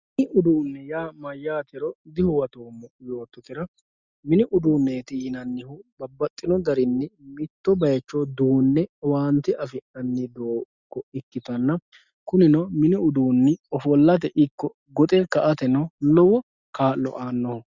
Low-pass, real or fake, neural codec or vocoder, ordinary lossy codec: 7.2 kHz; real; none; Opus, 64 kbps